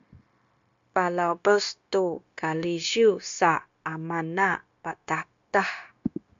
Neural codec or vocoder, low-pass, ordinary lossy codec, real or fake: codec, 16 kHz, 0.9 kbps, LongCat-Audio-Codec; 7.2 kHz; MP3, 64 kbps; fake